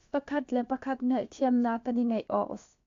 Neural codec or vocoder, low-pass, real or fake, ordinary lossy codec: codec, 16 kHz, about 1 kbps, DyCAST, with the encoder's durations; 7.2 kHz; fake; AAC, 48 kbps